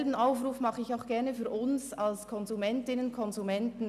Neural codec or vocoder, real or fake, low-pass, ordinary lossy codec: none; real; 14.4 kHz; none